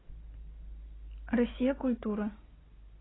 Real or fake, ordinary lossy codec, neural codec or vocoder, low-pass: real; AAC, 16 kbps; none; 7.2 kHz